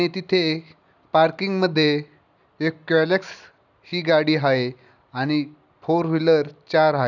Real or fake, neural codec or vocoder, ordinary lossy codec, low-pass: real; none; none; 7.2 kHz